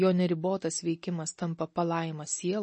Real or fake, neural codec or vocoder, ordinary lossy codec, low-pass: real; none; MP3, 32 kbps; 10.8 kHz